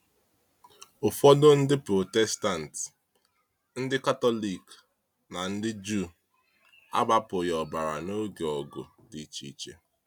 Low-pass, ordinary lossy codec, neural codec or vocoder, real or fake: 19.8 kHz; none; none; real